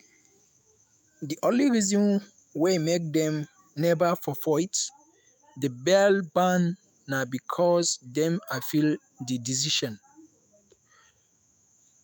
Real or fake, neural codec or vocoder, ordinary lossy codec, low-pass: fake; autoencoder, 48 kHz, 128 numbers a frame, DAC-VAE, trained on Japanese speech; none; none